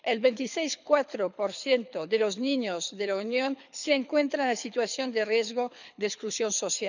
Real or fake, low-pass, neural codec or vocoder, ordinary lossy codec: fake; 7.2 kHz; codec, 24 kHz, 6 kbps, HILCodec; none